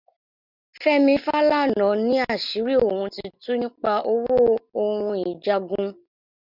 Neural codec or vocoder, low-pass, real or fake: none; 5.4 kHz; real